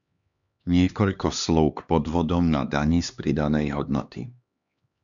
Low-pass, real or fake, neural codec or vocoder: 7.2 kHz; fake; codec, 16 kHz, 2 kbps, X-Codec, HuBERT features, trained on LibriSpeech